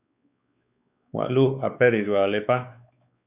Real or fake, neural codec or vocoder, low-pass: fake; codec, 16 kHz, 4 kbps, X-Codec, WavLM features, trained on Multilingual LibriSpeech; 3.6 kHz